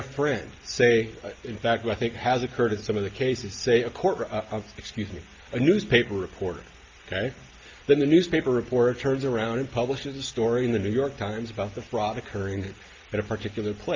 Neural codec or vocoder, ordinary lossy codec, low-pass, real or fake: none; Opus, 24 kbps; 7.2 kHz; real